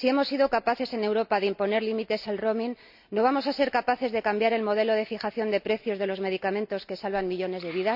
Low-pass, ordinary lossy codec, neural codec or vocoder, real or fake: 5.4 kHz; none; none; real